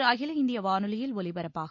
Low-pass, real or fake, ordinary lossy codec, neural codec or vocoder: 7.2 kHz; real; MP3, 48 kbps; none